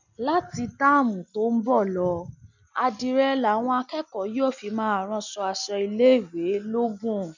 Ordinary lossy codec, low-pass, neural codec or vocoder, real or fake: none; 7.2 kHz; none; real